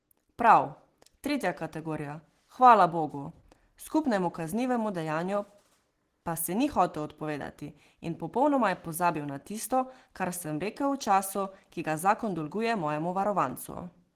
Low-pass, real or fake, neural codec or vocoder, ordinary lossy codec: 14.4 kHz; real; none; Opus, 16 kbps